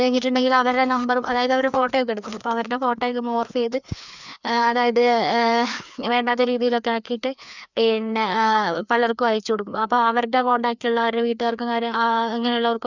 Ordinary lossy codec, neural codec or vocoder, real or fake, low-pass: none; codec, 16 kHz, 2 kbps, FreqCodec, larger model; fake; 7.2 kHz